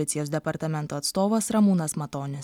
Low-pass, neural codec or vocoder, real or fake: 19.8 kHz; none; real